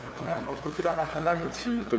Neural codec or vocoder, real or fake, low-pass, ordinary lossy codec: codec, 16 kHz, 2 kbps, FunCodec, trained on LibriTTS, 25 frames a second; fake; none; none